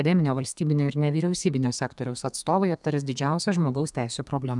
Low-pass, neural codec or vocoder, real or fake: 10.8 kHz; codec, 32 kHz, 1.9 kbps, SNAC; fake